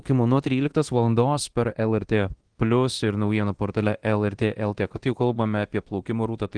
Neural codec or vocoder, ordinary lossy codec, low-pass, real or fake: codec, 24 kHz, 0.9 kbps, DualCodec; Opus, 16 kbps; 9.9 kHz; fake